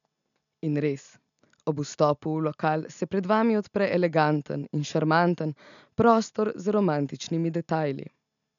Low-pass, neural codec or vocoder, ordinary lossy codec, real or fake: 7.2 kHz; none; none; real